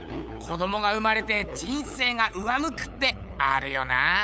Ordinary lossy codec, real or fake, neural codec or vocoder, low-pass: none; fake; codec, 16 kHz, 8 kbps, FunCodec, trained on LibriTTS, 25 frames a second; none